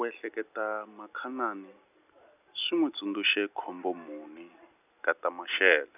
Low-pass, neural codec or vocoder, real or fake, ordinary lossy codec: 3.6 kHz; none; real; none